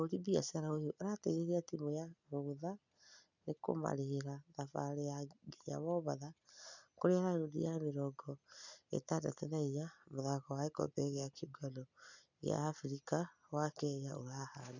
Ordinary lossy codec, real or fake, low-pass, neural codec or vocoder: none; real; 7.2 kHz; none